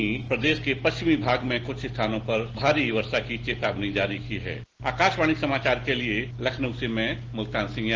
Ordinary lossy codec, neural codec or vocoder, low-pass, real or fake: Opus, 16 kbps; none; 7.2 kHz; real